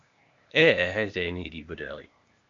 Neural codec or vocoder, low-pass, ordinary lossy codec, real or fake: codec, 16 kHz, 0.8 kbps, ZipCodec; 7.2 kHz; AAC, 64 kbps; fake